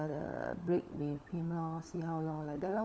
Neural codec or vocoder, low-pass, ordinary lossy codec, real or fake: codec, 16 kHz, 16 kbps, FunCodec, trained on LibriTTS, 50 frames a second; none; none; fake